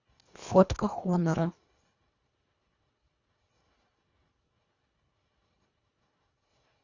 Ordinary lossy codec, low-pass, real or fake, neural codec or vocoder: Opus, 64 kbps; 7.2 kHz; fake; codec, 24 kHz, 1.5 kbps, HILCodec